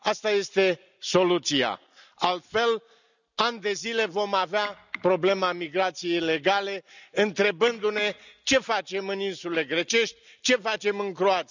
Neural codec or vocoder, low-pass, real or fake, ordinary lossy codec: none; 7.2 kHz; real; none